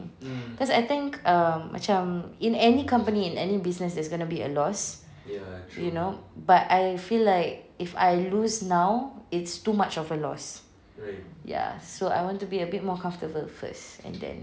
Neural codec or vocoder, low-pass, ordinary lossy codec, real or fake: none; none; none; real